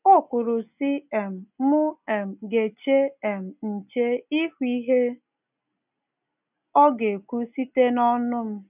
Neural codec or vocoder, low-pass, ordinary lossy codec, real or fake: none; 3.6 kHz; none; real